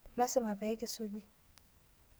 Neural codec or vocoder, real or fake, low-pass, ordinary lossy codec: codec, 44.1 kHz, 2.6 kbps, SNAC; fake; none; none